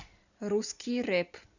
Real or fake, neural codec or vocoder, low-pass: real; none; 7.2 kHz